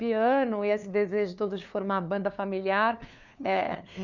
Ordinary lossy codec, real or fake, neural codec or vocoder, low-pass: none; fake; codec, 16 kHz, 2 kbps, FunCodec, trained on LibriTTS, 25 frames a second; 7.2 kHz